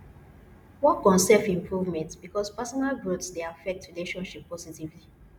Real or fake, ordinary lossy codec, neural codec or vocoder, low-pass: real; none; none; 19.8 kHz